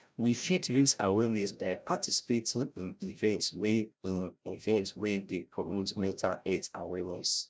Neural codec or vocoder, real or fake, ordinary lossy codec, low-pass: codec, 16 kHz, 0.5 kbps, FreqCodec, larger model; fake; none; none